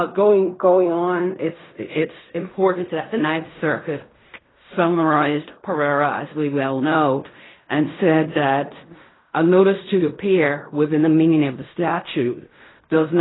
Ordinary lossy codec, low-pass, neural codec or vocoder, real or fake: AAC, 16 kbps; 7.2 kHz; codec, 16 kHz in and 24 kHz out, 0.4 kbps, LongCat-Audio-Codec, fine tuned four codebook decoder; fake